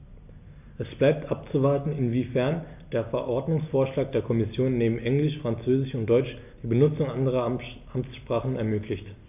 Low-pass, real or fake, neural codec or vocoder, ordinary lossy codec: 3.6 kHz; real; none; AAC, 32 kbps